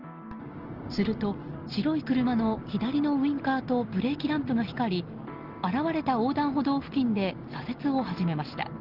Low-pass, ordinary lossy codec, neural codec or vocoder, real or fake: 5.4 kHz; Opus, 16 kbps; none; real